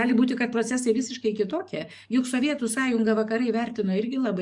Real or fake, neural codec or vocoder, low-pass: fake; codec, 44.1 kHz, 7.8 kbps, DAC; 10.8 kHz